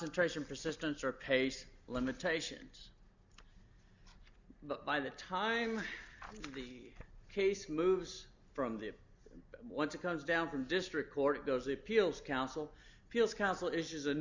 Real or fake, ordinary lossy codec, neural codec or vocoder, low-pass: real; Opus, 64 kbps; none; 7.2 kHz